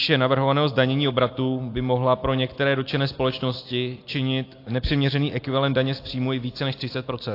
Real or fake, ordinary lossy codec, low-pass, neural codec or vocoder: real; AAC, 32 kbps; 5.4 kHz; none